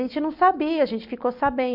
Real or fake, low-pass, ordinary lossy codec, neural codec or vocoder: real; 5.4 kHz; none; none